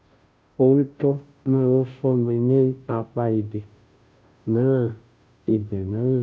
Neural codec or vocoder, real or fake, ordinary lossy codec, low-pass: codec, 16 kHz, 0.5 kbps, FunCodec, trained on Chinese and English, 25 frames a second; fake; none; none